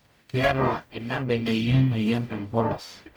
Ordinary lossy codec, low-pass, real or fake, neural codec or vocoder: none; none; fake; codec, 44.1 kHz, 0.9 kbps, DAC